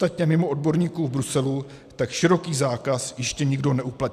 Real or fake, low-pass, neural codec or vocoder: real; 14.4 kHz; none